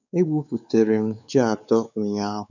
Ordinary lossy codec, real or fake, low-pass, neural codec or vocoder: none; fake; 7.2 kHz; codec, 16 kHz, 4 kbps, X-Codec, WavLM features, trained on Multilingual LibriSpeech